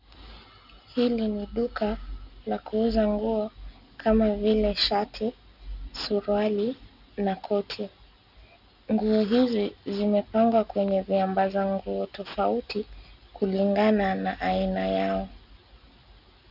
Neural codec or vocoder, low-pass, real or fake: none; 5.4 kHz; real